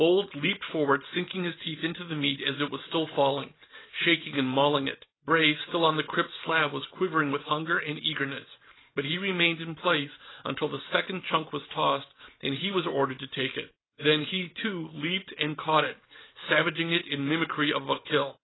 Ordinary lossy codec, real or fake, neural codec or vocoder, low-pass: AAC, 16 kbps; real; none; 7.2 kHz